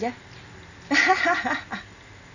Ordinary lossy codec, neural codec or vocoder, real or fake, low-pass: none; none; real; 7.2 kHz